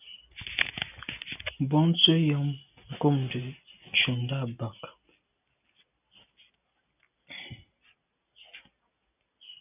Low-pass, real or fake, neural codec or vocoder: 3.6 kHz; real; none